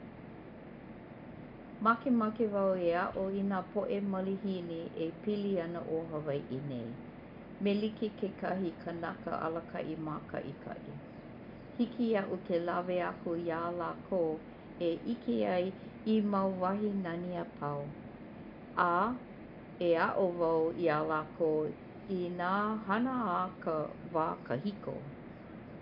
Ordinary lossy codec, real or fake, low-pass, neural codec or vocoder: none; real; 5.4 kHz; none